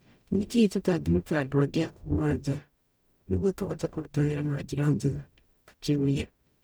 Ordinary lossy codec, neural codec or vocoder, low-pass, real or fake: none; codec, 44.1 kHz, 0.9 kbps, DAC; none; fake